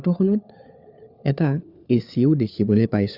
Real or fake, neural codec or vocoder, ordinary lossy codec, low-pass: fake; codec, 16 kHz, 4 kbps, FunCodec, trained on LibriTTS, 50 frames a second; Opus, 64 kbps; 5.4 kHz